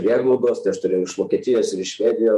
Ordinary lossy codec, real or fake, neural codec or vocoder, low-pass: MP3, 64 kbps; fake; vocoder, 48 kHz, 128 mel bands, Vocos; 14.4 kHz